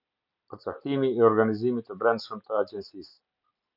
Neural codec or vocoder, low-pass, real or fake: none; 5.4 kHz; real